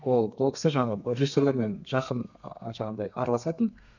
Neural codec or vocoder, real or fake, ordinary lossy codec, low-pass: codec, 32 kHz, 1.9 kbps, SNAC; fake; none; 7.2 kHz